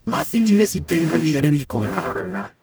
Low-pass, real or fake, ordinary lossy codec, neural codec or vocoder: none; fake; none; codec, 44.1 kHz, 0.9 kbps, DAC